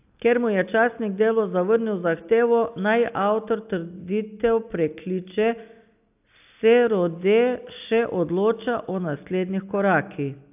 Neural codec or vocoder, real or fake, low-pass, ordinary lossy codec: none; real; 3.6 kHz; AAC, 32 kbps